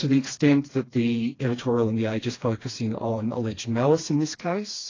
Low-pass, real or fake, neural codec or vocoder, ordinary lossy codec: 7.2 kHz; fake; codec, 16 kHz, 2 kbps, FreqCodec, smaller model; AAC, 32 kbps